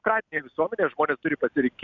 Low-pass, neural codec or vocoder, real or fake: 7.2 kHz; none; real